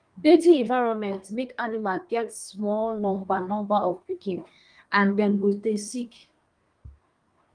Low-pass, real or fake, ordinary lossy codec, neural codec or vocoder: 9.9 kHz; fake; Opus, 32 kbps; codec, 24 kHz, 1 kbps, SNAC